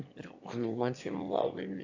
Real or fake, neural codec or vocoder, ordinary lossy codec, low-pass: fake; autoencoder, 22.05 kHz, a latent of 192 numbers a frame, VITS, trained on one speaker; AAC, 48 kbps; 7.2 kHz